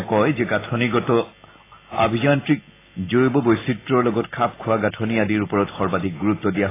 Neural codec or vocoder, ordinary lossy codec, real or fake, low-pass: none; AAC, 16 kbps; real; 3.6 kHz